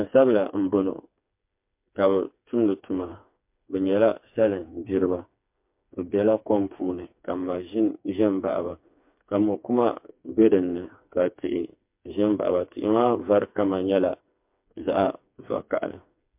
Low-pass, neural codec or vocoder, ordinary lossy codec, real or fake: 3.6 kHz; codec, 16 kHz, 4 kbps, FreqCodec, smaller model; MP3, 32 kbps; fake